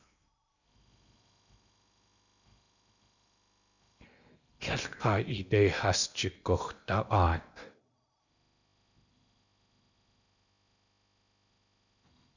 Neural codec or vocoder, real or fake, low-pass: codec, 16 kHz in and 24 kHz out, 0.8 kbps, FocalCodec, streaming, 65536 codes; fake; 7.2 kHz